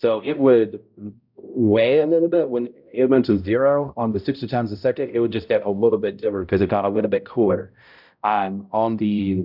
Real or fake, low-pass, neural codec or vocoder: fake; 5.4 kHz; codec, 16 kHz, 0.5 kbps, X-Codec, HuBERT features, trained on balanced general audio